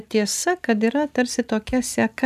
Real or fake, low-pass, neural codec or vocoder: real; 14.4 kHz; none